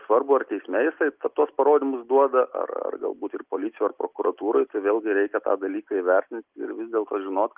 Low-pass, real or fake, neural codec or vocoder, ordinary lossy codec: 3.6 kHz; real; none; Opus, 32 kbps